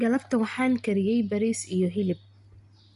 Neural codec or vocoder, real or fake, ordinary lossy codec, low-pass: none; real; none; 10.8 kHz